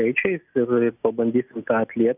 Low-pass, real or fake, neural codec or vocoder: 3.6 kHz; real; none